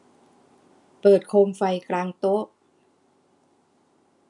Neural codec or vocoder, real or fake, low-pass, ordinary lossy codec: none; real; 10.8 kHz; none